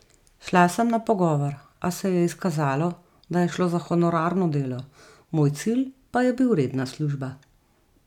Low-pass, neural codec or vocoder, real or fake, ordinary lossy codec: 19.8 kHz; none; real; none